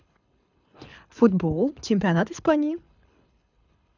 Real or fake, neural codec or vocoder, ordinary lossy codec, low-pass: fake; codec, 24 kHz, 6 kbps, HILCodec; none; 7.2 kHz